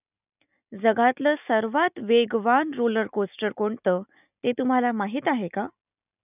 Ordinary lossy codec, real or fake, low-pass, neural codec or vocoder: none; real; 3.6 kHz; none